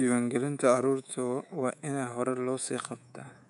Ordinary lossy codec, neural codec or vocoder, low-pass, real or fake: none; codec, 24 kHz, 3.1 kbps, DualCodec; 10.8 kHz; fake